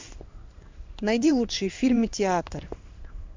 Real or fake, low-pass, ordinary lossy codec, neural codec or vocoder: fake; 7.2 kHz; MP3, 64 kbps; codec, 16 kHz in and 24 kHz out, 1 kbps, XY-Tokenizer